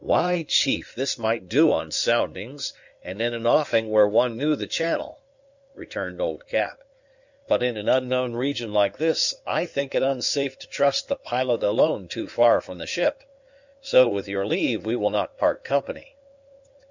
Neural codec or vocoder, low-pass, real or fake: codec, 16 kHz in and 24 kHz out, 2.2 kbps, FireRedTTS-2 codec; 7.2 kHz; fake